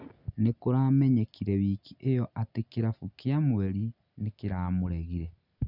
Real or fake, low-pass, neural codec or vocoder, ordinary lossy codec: real; 5.4 kHz; none; none